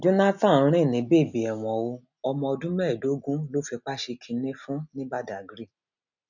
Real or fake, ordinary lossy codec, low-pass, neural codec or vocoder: real; none; 7.2 kHz; none